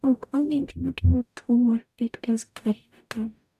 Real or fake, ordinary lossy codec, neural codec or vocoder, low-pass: fake; none; codec, 44.1 kHz, 0.9 kbps, DAC; 14.4 kHz